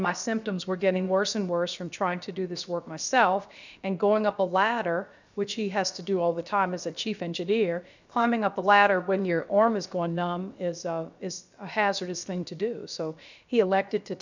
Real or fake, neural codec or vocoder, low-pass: fake; codec, 16 kHz, about 1 kbps, DyCAST, with the encoder's durations; 7.2 kHz